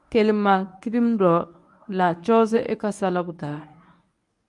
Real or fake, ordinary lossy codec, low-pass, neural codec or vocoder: fake; MP3, 96 kbps; 10.8 kHz; codec, 24 kHz, 0.9 kbps, WavTokenizer, medium speech release version 1